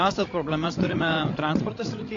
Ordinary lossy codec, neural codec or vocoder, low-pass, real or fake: AAC, 32 kbps; codec, 16 kHz, 16 kbps, FreqCodec, larger model; 7.2 kHz; fake